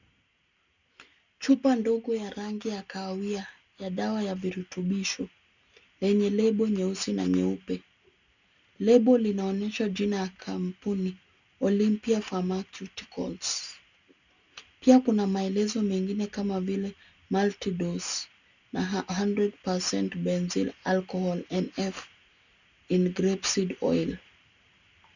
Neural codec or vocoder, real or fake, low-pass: none; real; 7.2 kHz